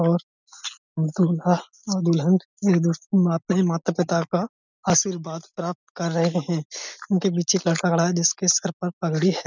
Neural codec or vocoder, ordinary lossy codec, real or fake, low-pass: none; none; real; 7.2 kHz